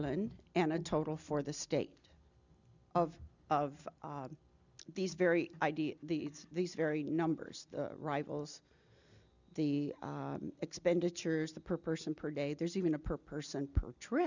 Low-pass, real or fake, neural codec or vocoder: 7.2 kHz; real; none